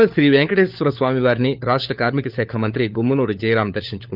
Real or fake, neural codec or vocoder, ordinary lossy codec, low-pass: fake; codec, 16 kHz, 4 kbps, FunCodec, trained on LibriTTS, 50 frames a second; Opus, 32 kbps; 5.4 kHz